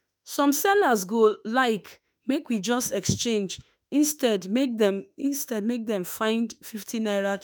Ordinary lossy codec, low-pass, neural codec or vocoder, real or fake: none; none; autoencoder, 48 kHz, 32 numbers a frame, DAC-VAE, trained on Japanese speech; fake